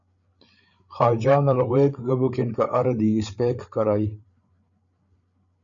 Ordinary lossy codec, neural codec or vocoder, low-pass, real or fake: MP3, 96 kbps; codec, 16 kHz, 8 kbps, FreqCodec, larger model; 7.2 kHz; fake